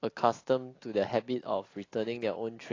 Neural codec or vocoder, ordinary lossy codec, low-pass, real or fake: none; AAC, 32 kbps; 7.2 kHz; real